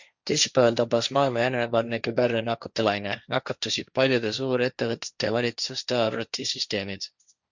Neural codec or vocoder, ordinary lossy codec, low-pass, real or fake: codec, 16 kHz, 1.1 kbps, Voila-Tokenizer; Opus, 64 kbps; 7.2 kHz; fake